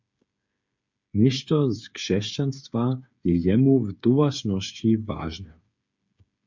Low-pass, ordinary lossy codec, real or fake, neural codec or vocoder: 7.2 kHz; MP3, 64 kbps; fake; codec, 16 kHz, 8 kbps, FreqCodec, smaller model